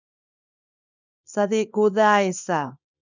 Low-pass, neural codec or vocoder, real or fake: 7.2 kHz; codec, 24 kHz, 0.9 kbps, WavTokenizer, small release; fake